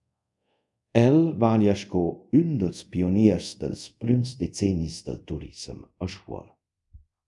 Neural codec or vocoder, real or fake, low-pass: codec, 24 kHz, 0.5 kbps, DualCodec; fake; 10.8 kHz